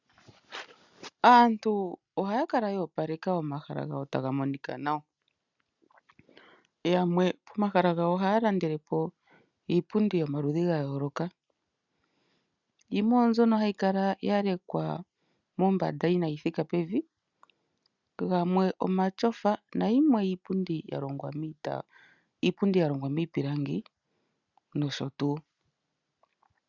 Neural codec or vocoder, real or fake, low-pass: none; real; 7.2 kHz